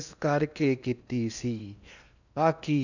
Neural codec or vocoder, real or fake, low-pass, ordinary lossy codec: codec, 16 kHz in and 24 kHz out, 0.8 kbps, FocalCodec, streaming, 65536 codes; fake; 7.2 kHz; none